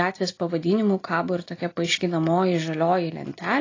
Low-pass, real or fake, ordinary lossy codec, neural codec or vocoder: 7.2 kHz; real; AAC, 32 kbps; none